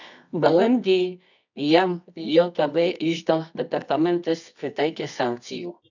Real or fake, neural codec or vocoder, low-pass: fake; codec, 24 kHz, 0.9 kbps, WavTokenizer, medium music audio release; 7.2 kHz